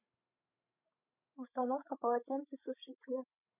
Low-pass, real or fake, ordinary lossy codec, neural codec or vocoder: 3.6 kHz; fake; none; codec, 16 kHz, 16 kbps, FreqCodec, larger model